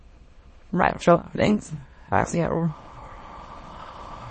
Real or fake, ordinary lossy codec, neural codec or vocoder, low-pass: fake; MP3, 32 kbps; autoencoder, 22.05 kHz, a latent of 192 numbers a frame, VITS, trained on many speakers; 9.9 kHz